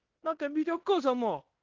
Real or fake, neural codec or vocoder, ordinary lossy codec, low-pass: fake; codec, 16 kHz in and 24 kHz out, 0.9 kbps, LongCat-Audio-Codec, fine tuned four codebook decoder; Opus, 16 kbps; 7.2 kHz